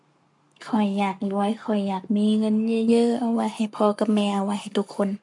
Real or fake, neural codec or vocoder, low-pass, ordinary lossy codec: fake; codec, 44.1 kHz, 7.8 kbps, Pupu-Codec; 10.8 kHz; MP3, 64 kbps